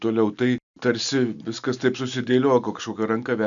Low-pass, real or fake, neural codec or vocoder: 7.2 kHz; real; none